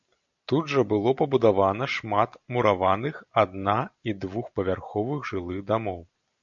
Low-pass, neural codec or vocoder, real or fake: 7.2 kHz; none; real